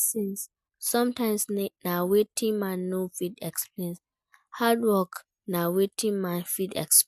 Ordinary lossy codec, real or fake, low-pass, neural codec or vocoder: MP3, 96 kbps; real; 14.4 kHz; none